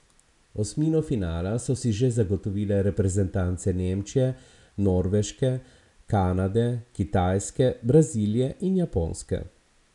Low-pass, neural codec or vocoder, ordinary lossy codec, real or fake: 10.8 kHz; none; none; real